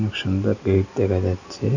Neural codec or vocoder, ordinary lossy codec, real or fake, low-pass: none; none; real; 7.2 kHz